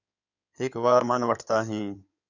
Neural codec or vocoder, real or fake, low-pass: codec, 16 kHz in and 24 kHz out, 2.2 kbps, FireRedTTS-2 codec; fake; 7.2 kHz